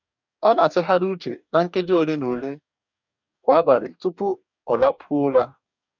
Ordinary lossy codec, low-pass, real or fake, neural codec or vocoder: none; 7.2 kHz; fake; codec, 44.1 kHz, 2.6 kbps, DAC